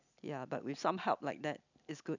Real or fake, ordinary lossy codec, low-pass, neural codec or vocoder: real; none; 7.2 kHz; none